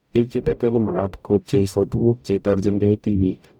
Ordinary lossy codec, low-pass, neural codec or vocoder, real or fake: none; 19.8 kHz; codec, 44.1 kHz, 0.9 kbps, DAC; fake